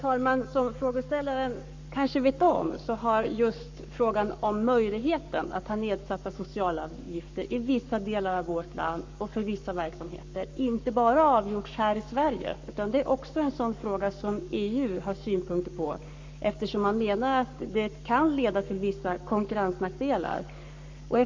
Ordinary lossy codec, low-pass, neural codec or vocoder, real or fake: MP3, 64 kbps; 7.2 kHz; codec, 44.1 kHz, 7.8 kbps, Pupu-Codec; fake